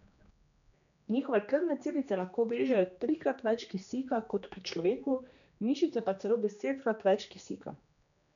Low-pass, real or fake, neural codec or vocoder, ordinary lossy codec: 7.2 kHz; fake; codec, 16 kHz, 2 kbps, X-Codec, HuBERT features, trained on general audio; none